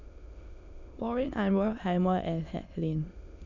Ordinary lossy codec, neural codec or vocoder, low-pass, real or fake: none; autoencoder, 22.05 kHz, a latent of 192 numbers a frame, VITS, trained on many speakers; 7.2 kHz; fake